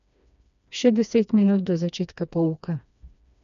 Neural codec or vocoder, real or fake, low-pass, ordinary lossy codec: codec, 16 kHz, 2 kbps, FreqCodec, smaller model; fake; 7.2 kHz; none